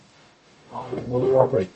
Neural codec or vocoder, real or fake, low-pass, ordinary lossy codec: codec, 44.1 kHz, 0.9 kbps, DAC; fake; 10.8 kHz; MP3, 32 kbps